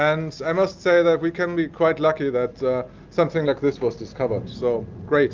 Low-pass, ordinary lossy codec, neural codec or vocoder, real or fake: 7.2 kHz; Opus, 32 kbps; none; real